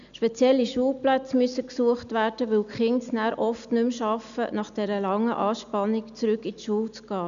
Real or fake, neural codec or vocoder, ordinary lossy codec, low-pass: real; none; none; 7.2 kHz